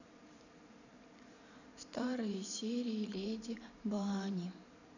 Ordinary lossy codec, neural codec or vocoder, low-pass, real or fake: none; vocoder, 44.1 kHz, 128 mel bands every 512 samples, BigVGAN v2; 7.2 kHz; fake